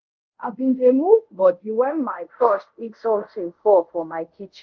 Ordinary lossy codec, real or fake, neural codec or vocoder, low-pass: Opus, 24 kbps; fake; codec, 24 kHz, 0.5 kbps, DualCodec; 7.2 kHz